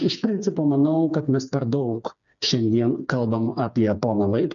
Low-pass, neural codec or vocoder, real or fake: 7.2 kHz; codec, 16 kHz, 4 kbps, FreqCodec, smaller model; fake